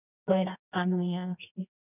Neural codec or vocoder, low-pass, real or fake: codec, 24 kHz, 0.9 kbps, WavTokenizer, medium music audio release; 3.6 kHz; fake